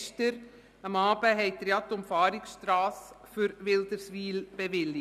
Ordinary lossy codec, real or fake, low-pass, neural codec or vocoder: none; real; 14.4 kHz; none